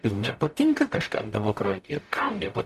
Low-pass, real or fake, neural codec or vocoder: 14.4 kHz; fake; codec, 44.1 kHz, 0.9 kbps, DAC